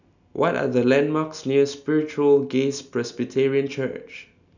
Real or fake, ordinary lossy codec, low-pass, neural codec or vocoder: real; none; 7.2 kHz; none